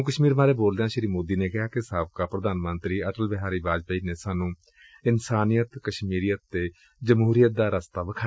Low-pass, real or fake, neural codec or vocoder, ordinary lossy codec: none; real; none; none